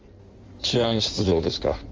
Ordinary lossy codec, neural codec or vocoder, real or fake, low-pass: Opus, 32 kbps; codec, 16 kHz in and 24 kHz out, 1.1 kbps, FireRedTTS-2 codec; fake; 7.2 kHz